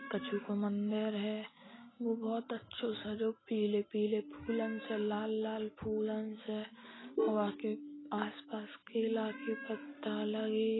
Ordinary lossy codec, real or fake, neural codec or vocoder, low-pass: AAC, 16 kbps; real; none; 7.2 kHz